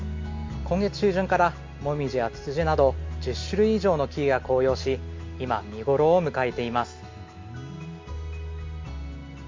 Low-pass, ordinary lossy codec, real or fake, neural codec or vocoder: 7.2 kHz; MP3, 48 kbps; real; none